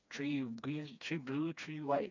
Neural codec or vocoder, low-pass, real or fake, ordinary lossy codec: codec, 16 kHz, 2 kbps, FreqCodec, smaller model; 7.2 kHz; fake; none